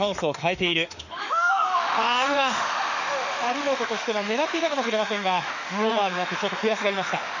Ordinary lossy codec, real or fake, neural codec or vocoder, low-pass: none; fake; autoencoder, 48 kHz, 32 numbers a frame, DAC-VAE, trained on Japanese speech; 7.2 kHz